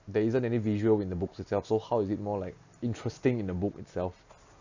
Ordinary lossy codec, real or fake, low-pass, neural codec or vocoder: Opus, 64 kbps; real; 7.2 kHz; none